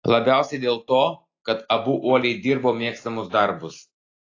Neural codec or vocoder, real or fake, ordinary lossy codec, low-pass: none; real; AAC, 32 kbps; 7.2 kHz